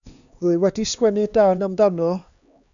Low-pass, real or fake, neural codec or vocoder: 7.2 kHz; fake; codec, 16 kHz, 2 kbps, X-Codec, HuBERT features, trained on LibriSpeech